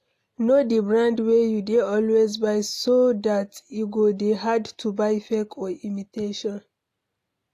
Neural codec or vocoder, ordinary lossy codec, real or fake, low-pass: none; AAC, 64 kbps; real; 14.4 kHz